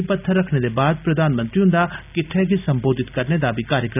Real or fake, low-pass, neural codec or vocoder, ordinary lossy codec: real; 3.6 kHz; none; none